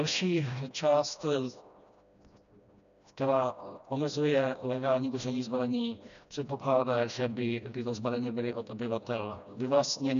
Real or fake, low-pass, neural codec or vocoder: fake; 7.2 kHz; codec, 16 kHz, 1 kbps, FreqCodec, smaller model